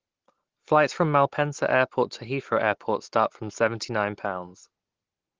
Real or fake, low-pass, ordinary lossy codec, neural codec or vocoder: real; 7.2 kHz; Opus, 16 kbps; none